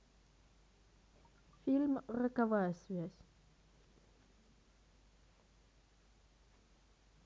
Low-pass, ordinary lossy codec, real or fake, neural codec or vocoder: none; none; real; none